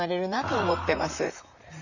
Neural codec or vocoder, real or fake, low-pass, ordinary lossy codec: vocoder, 44.1 kHz, 128 mel bands, Pupu-Vocoder; fake; 7.2 kHz; none